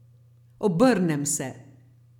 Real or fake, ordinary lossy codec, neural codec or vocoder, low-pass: real; none; none; 19.8 kHz